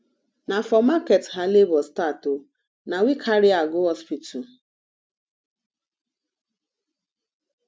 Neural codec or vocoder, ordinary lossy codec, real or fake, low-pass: none; none; real; none